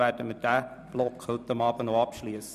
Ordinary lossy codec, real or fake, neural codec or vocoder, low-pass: none; fake; vocoder, 44.1 kHz, 128 mel bands every 256 samples, BigVGAN v2; 14.4 kHz